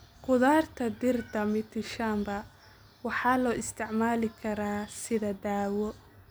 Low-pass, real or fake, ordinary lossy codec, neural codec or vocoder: none; real; none; none